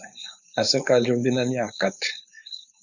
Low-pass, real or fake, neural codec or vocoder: 7.2 kHz; fake; codec, 16 kHz, 4.8 kbps, FACodec